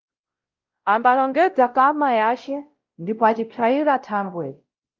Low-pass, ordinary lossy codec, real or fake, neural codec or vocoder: 7.2 kHz; Opus, 32 kbps; fake; codec, 16 kHz, 0.5 kbps, X-Codec, WavLM features, trained on Multilingual LibriSpeech